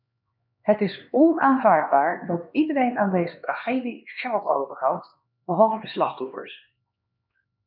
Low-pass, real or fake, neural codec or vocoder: 5.4 kHz; fake; codec, 16 kHz, 2 kbps, X-Codec, HuBERT features, trained on LibriSpeech